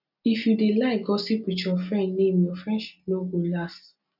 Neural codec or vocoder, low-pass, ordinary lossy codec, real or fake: none; 5.4 kHz; none; real